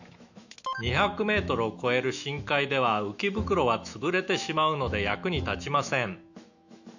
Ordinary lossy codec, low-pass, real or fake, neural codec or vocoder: none; 7.2 kHz; fake; autoencoder, 48 kHz, 128 numbers a frame, DAC-VAE, trained on Japanese speech